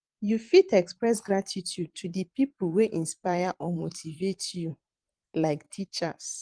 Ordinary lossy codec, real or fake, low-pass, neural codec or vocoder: Opus, 24 kbps; fake; 9.9 kHz; vocoder, 44.1 kHz, 128 mel bands every 512 samples, BigVGAN v2